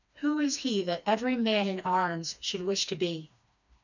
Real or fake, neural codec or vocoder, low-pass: fake; codec, 16 kHz, 2 kbps, FreqCodec, smaller model; 7.2 kHz